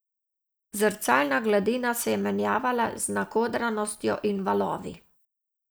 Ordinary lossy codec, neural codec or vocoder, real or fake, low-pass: none; none; real; none